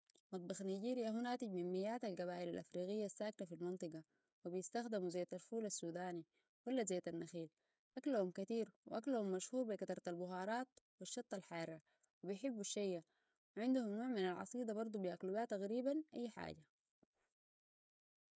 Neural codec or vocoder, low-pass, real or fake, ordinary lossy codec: codec, 16 kHz, 16 kbps, FreqCodec, smaller model; none; fake; none